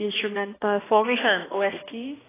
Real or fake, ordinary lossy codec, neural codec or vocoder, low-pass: fake; AAC, 16 kbps; codec, 16 kHz, 1 kbps, X-Codec, HuBERT features, trained on balanced general audio; 3.6 kHz